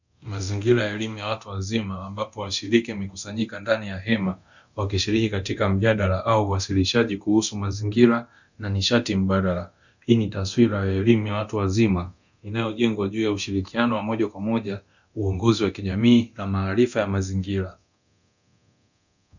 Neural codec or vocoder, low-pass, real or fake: codec, 24 kHz, 0.9 kbps, DualCodec; 7.2 kHz; fake